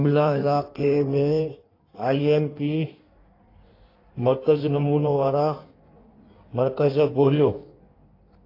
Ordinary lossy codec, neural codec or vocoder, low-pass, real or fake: AAC, 32 kbps; codec, 16 kHz in and 24 kHz out, 1.1 kbps, FireRedTTS-2 codec; 5.4 kHz; fake